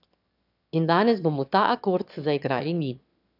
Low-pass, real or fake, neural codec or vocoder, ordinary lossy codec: 5.4 kHz; fake; autoencoder, 22.05 kHz, a latent of 192 numbers a frame, VITS, trained on one speaker; none